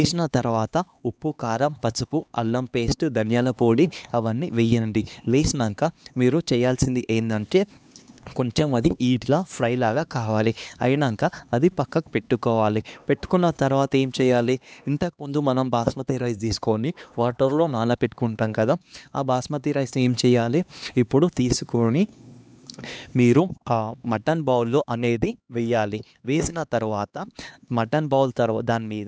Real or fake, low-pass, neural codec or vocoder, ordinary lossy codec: fake; none; codec, 16 kHz, 2 kbps, X-Codec, HuBERT features, trained on LibriSpeech; none